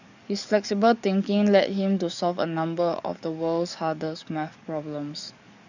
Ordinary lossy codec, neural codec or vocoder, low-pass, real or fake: none; codec, 44.1 kHz, 7.8 kbps, DAC; 7.2 kHz; fake